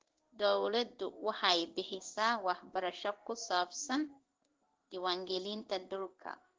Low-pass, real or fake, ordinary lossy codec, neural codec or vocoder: 7.2 kHz; fake; Opus, 16 kbps; codec, 16 kHz in and 24 kHz out, 1 kbps, XY-Tokenizer